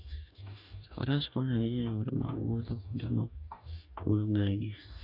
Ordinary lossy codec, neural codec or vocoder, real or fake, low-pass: none; codec, 44.1 kHz, 2.6 kbps, DAC; fake; 5.4 kHz